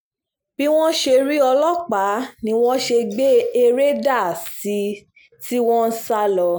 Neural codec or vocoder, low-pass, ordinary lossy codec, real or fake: none; none; none; real